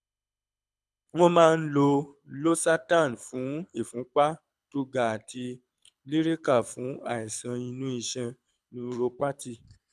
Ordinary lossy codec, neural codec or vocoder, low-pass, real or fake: none; codec, 24 kHz, 6 kbps, HILCodec; none; fake